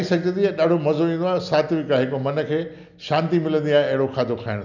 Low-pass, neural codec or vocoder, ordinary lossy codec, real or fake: 7.2 kHz; none; none; real